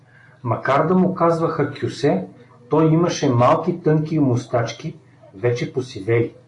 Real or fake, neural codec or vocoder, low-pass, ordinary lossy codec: real; none; 10.8 kHz; AAC, 48 kbps